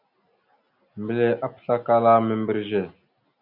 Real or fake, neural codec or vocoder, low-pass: real; none; 5.4 kHz